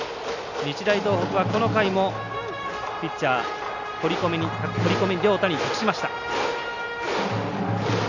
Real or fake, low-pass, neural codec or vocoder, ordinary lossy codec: real; 7.2 kHz; none; none